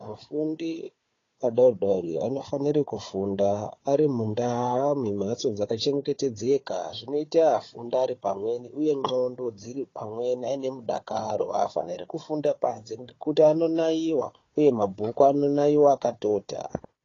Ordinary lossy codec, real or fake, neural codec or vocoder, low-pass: AAC, 32 kbps; fake; codec, 16 kHz, 4 kbps, FunCodec, trained on Chinese and English, 50 frames a second; 7.2 kHz